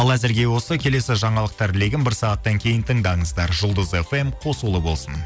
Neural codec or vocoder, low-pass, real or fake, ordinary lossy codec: none; none; real; none